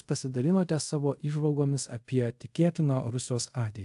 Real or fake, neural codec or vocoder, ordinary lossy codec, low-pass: fake; codec, 24 kHz, 0.5 kbps, DualCodec; AAC, 64 kbps; 10.8 kHz